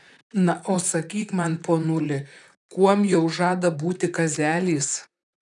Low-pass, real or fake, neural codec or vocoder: 10.8 kHz; fake; vocoder, 44.1 kHz, 128 mel bands, Pupu-Vocoder